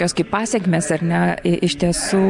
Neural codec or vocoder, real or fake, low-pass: none; real; 10.8 kHz